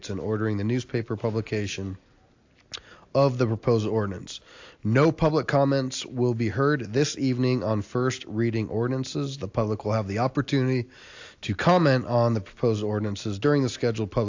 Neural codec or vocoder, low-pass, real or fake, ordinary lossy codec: none; 7.2 kHz; real; AAC, 48 kbps